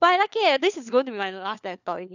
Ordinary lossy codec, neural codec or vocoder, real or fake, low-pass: none; codec, 16 kHz, 4 kbps, FunCodec, trained on LibriTTS, 50 frames a second; fake; 7.2 kHz